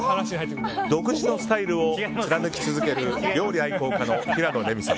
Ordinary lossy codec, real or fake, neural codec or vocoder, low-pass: none; real; none; none